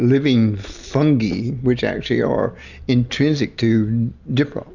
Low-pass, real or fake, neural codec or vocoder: 7.2 kHz; real; none